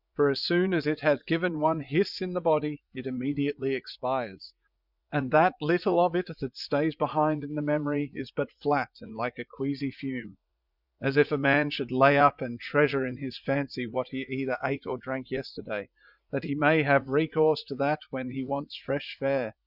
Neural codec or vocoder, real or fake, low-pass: vocoder, 44.1 kHz, 80 mel bands, Vocos; fake; 5.4 kHz